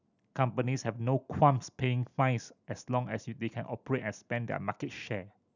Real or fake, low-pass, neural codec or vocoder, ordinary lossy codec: real; 7.2 kHz; none; none